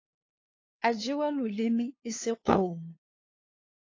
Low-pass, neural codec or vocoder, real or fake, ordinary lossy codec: 7.2 kHz; codec, 16 kHz, 2 kbps, FunCodec, trained on LibriTTS, 25 frames a second; fake; AAC, 32 kbps